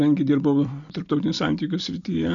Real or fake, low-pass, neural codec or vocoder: real; 7.2 kHz; none